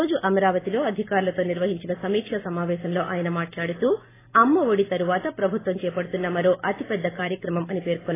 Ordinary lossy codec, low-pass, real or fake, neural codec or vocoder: AAC, 16 kbps; 3.6 kHz; real; none